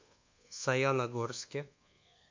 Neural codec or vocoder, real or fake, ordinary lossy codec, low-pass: codec, 24 kHz, 1.2 kbps, DualCodec; fake; MP3, 48 kbps; 7.2 kHz